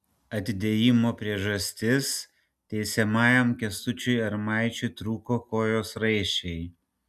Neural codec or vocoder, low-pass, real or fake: none; 14.4 kHz; real